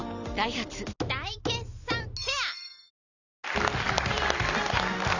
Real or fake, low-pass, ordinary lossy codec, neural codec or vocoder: fake; 7.2 kHz; none; vocoder, 44.1 kHz, 128 mel bands every 256 samples, BigVGAN v2